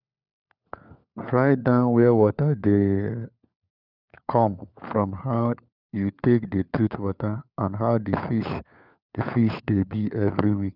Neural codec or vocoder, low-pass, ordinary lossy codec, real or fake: codec, 16 kHz, 4 kbps, FunCodec, trained on LibriTTS, 50 frames a second; 5.4 kHz; none; fake